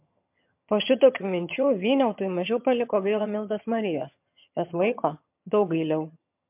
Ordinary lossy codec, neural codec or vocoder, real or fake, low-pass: MP3, 32 kbps; vocoder, 22.05 kHz, 80 mel bands, HiFi-GAN; fake; 3.6 kHz